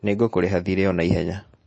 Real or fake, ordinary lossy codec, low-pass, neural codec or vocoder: real; MP3, 32 kbps; 9.9 kHz; none